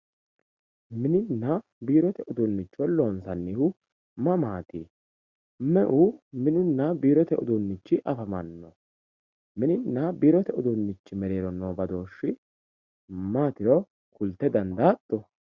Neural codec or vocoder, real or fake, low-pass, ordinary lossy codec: none; real; 7.2 kHz; Opus, 64 kbps